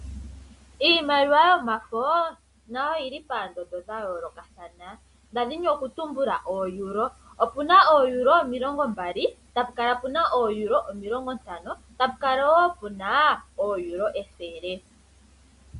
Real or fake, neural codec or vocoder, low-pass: real; none; 10.8 kHz